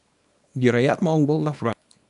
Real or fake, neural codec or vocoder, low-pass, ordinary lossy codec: fake; codec, 24 kHz, 0.9 kbps, WavTokenizer, small release; 10.8 kHz; AAC, 96 kbps